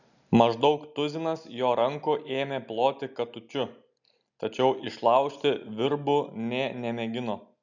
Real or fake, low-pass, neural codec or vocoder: real; 7.2 kHz; none